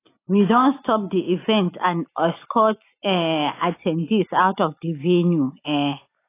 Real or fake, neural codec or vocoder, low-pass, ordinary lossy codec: real; none; 3.6 kHz; AAC, 24 kbps